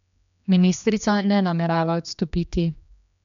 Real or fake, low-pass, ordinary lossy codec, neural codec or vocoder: fake; 7.2 kHz; none; codec, 16 kHz, 2 kbps, X-Codec, HuBERT features, trained on general audio